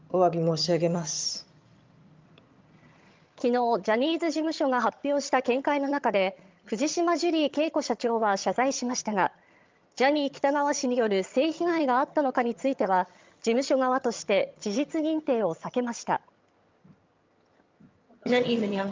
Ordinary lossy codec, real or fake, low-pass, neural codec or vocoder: Opus, 24 kbps; fake; 7.2 kHz; vocoder, 22.05 kHz, 80 mel bands, HiFi-GAN